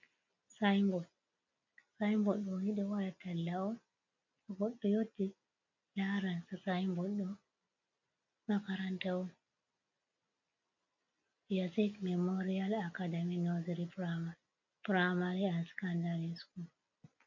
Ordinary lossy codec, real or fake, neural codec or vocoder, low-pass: MP3, 64 kbps; real; none; 7.2 kHz